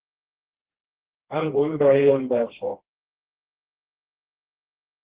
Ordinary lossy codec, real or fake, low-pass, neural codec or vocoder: Opus, 16 kbps; fake; 3.6 kHz; codec, 16 kHz, 1 kbps, FreqCodec, smaller model